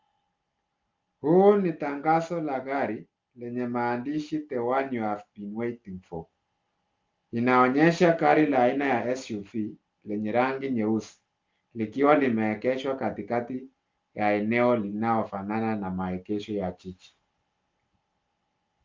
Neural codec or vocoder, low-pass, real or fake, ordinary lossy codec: none; 7.2 kHz; real; Opus, 24 kbps